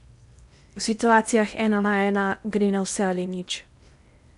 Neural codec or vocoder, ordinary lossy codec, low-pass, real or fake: codec, 16 kHz in and 24 kHz out, 0.8 kbps, FocalCodec, streaming, 65536 codes; none; 10.8 kHz; fake